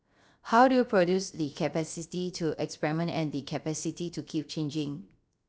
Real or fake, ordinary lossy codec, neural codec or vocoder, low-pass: fake; none; codec, 16 kHz, 0.7 kbps, FocalCodec; none